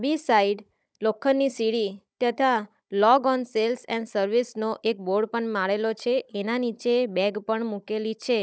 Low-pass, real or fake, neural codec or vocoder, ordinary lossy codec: none; fake; codec, 16 kHz, 16 kbps, FunCodec, trained on Chinese and English, 50 frames a second; none